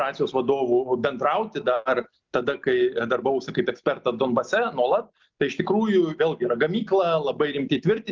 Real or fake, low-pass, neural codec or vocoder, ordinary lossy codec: real; 7.2 kHz; none; Opus, 32 kbps